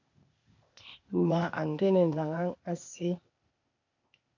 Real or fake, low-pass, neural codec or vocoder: fake; 7.2 kHz; codec, 16 kHz, 0.8 kbps, ZipCodec